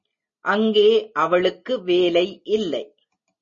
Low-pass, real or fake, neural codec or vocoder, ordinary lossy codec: 7.2 kHz; real; none; MP3, 32 kbps